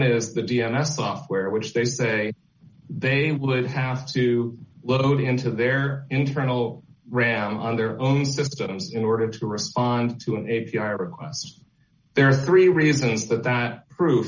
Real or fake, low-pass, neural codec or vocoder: real; 7.2 kHz; none